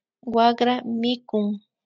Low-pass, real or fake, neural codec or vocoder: 7.2 kHz; real; none